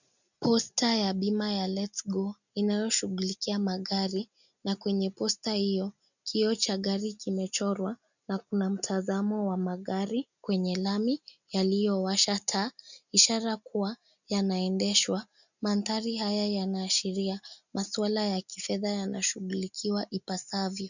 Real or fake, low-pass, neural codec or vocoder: real; 7.2 kHz; none